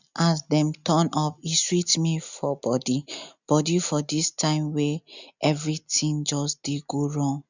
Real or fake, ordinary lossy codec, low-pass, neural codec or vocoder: real; none; 7.2 kHz; none